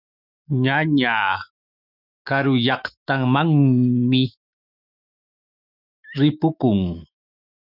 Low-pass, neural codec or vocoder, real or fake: 5.4 kHz; autoencoder, 48 kHz, 128 numbers a frame, DAC-VAE, trained on Japanese speech; fake